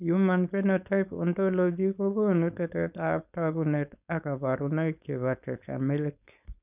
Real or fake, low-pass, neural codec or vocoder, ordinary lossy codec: fake; 3.6 kHz; codec, 16 kHz, 4.8 kbps, FACodec; none